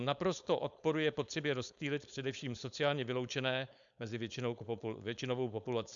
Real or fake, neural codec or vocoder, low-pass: fake; codec, 16 kHz, 4.8 kbps, FACodec; 7.2 kHz